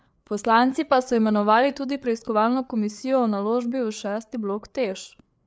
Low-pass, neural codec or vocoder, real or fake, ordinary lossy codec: none; codec, 16 kHz, 4 kbps, FreqCodec, larger model; fake; none